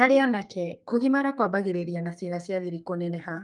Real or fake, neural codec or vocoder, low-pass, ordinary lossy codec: fake; codec, 32 kHz, 1.9 kbps, SNAC; 10.8 kHz; Opus, 32 kbps